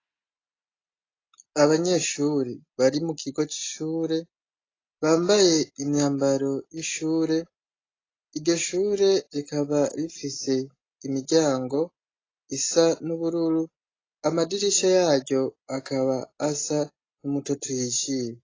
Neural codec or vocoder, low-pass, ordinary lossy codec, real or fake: none; 7.2 kHz; AAC, 32 kbps; real